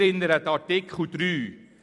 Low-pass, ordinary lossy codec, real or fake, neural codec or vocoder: 10.8 kHz; none; fake; vocoder, 44.1 kHz, 128 mel bands every 256 samples, BigVGAN v2